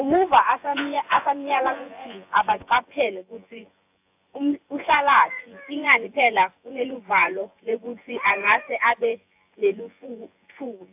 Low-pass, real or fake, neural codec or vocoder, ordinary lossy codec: 3.6 kHz; fake; vocoder, 24 kHz, 100 mel bands, Vocos; AAC, 32 kbps